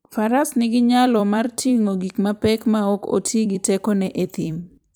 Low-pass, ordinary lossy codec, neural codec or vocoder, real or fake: none; none; vocoder, 44.1 kHz, 128 mel bands every 256 samples, BigVGAN v2; fake